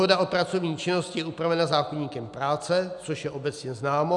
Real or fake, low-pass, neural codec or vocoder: real; 10.8 kHz; none